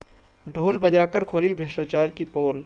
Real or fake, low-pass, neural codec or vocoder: fake; 9.9 kHz; codec, 16 kHz in and 24 kHz out, 1.1 kbps, FireRedTTS-2 codec